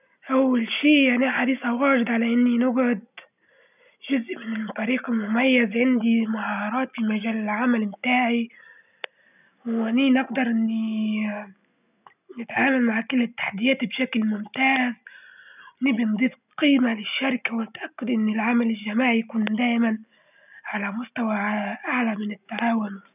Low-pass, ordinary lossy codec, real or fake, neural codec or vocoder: 3.6 kHz; none; real; none